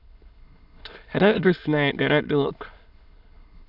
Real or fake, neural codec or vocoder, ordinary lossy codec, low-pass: fake; autoencoder, 22.05 kHz, a latent of 192 numbers a frame, VITS, trained on many speakers; none; 5.4 kHz